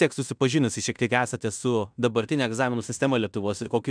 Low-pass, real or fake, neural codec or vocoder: 9.9 kHz; fake; codec, 16 kHz in and 24 kHz out, 0.9 kbps, LongCat-Audio-Codec, fine tuned four codebook decoder